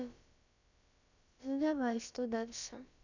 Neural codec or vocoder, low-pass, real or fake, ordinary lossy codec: codec, 16 kHz, about 1 kbps, DyCAST, with the encoder's durations; 7.2 kHz; fake; none